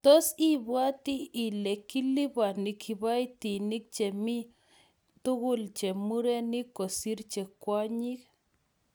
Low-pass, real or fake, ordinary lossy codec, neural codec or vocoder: none; real; none; none